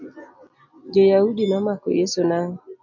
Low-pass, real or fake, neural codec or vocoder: 7.2 kHz; real; none